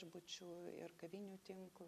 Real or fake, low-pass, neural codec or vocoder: fake; 14.4 kHz; vocoder, 44.1 kHz, 128 mel bands every 256 samples, BigVGAN v2